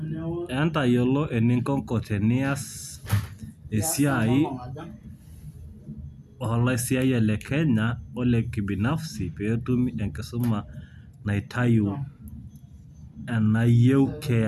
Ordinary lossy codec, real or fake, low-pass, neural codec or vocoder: none; real; 14.4 kHz; none